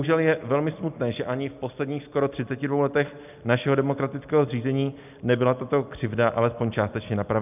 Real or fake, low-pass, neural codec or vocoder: real; 3.6 kHz; none